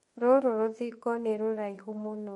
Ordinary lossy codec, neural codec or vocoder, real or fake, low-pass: MP3, 48 kbps; autoencoder, 48 kHz, 32 numbers a frame, DAC-VAE, trained on Japanese speech; fake; 19.8 kHz